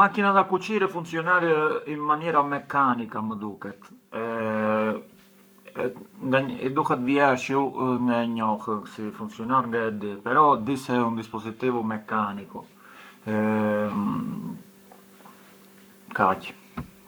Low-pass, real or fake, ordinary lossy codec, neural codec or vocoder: none; fake; none; codec, 44.1 kHz, 7.8 kbps, Pupu-Codec